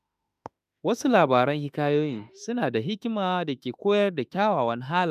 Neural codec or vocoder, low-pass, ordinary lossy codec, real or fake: autoencoder, 48 kHz, 32 numbers a frame, DAC-VAE, trained on Japanese speech; 14.4 kHz; none; fake